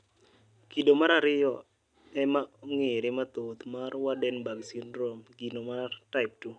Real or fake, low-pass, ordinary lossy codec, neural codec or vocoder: real; 9.9 kHz; none; none